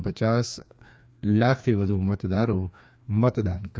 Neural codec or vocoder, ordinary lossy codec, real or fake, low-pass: codec, 16 kHz, 2 kbps, FreqCodec, larger model; none; fake; none